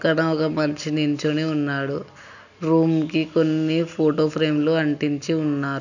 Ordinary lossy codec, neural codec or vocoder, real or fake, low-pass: none; none; real; 7.2 kHz